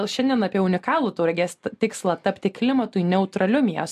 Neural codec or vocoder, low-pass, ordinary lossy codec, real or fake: none; 14.4 kHz; MP3, 64 kbps; real